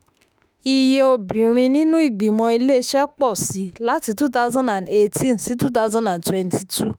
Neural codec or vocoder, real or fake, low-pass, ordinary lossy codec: autoencoder, 48 kHz, 32 numbers a frame, DAC-VAE, trained on Japanese speech; fake; none; none